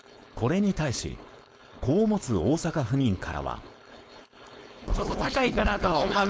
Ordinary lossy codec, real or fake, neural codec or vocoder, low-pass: none; fake; codec, 16 kHz, 4.8 kbps, FACodec; none